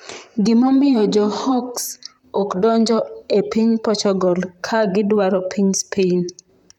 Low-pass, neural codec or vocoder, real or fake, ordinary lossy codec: 19.8 kHz; vocoder, 44.1 kHz, 128 mel bands, Pupu-Vocoder; fake; none